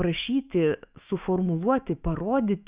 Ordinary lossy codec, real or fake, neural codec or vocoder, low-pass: Opus, 64 kbps; fake; autoencoder, 48 kHz, 128 numbers a frame, DAC-VAE, trained on Japanese speech; 3.6 kHz